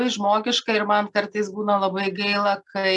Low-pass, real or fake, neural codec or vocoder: 10.8 kHz; real; none